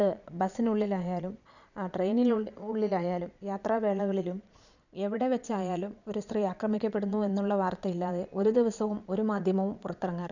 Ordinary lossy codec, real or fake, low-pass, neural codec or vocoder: none; fake; 7.2 kHz; vocoder, 22.05 kHz, 80 mel bands, Vocos